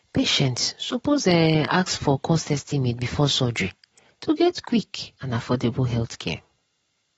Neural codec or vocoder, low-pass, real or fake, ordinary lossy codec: none; 19.8 kHz; real; AAC, 24 kbps